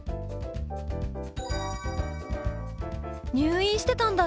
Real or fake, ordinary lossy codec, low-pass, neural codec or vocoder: real; none; none; none